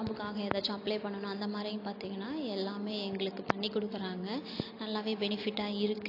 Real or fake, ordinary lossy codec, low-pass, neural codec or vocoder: real; AAC, 32 kbps; 5.4 kHz; none